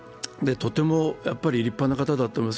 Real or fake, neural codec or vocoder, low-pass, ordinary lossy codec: real; none; none; none